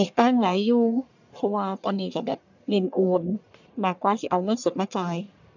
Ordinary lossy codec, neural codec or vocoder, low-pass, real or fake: none; codec, 44.1 kHz, 1.7 kbps, Pupu-Codec; 7.2 kHz; fake